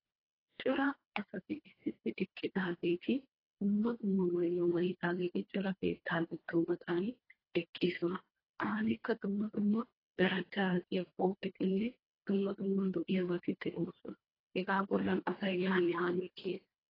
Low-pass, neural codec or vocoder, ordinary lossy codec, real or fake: 5.4 kHz; codec, 24 kHz, 1.5 kbps, HILCodec; AAC, 24 kbps; fake